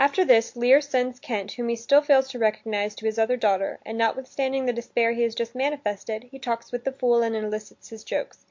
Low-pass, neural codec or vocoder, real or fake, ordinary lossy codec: 7.2 kHz; none; real; MP3, 48 kbps